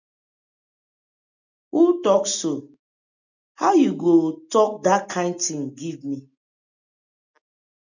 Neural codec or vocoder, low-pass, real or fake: none; 7.2 kHz; real